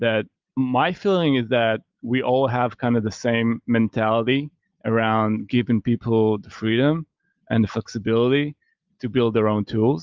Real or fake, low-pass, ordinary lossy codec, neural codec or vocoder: real; 7.2 kHz; Opus, 24 kbps; none